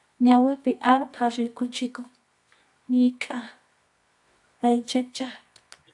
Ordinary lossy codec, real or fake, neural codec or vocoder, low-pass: AAC, 64 kbps; fake; codec, 24 kHz, 0.9 kbps, WavTokenizer, medium music audio release; 10.8 kHz